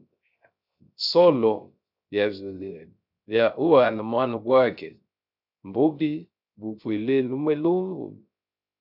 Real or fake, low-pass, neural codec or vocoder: fake; 5.4 kHz; codec, 16 kHz, 0.3 kbps, FocalCodec